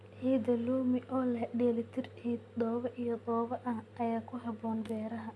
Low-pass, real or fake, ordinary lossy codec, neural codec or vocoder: none; real; none; none